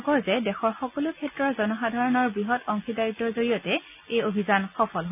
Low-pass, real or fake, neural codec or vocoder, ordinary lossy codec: 3.6 kHz; real; none; none